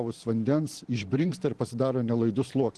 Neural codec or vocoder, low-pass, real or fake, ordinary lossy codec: vocoder, 44.1 kHz, 128 mel bands every 512 samples, BigVGAN v2; 10.8 kHz; fake; Opus, 16 kbps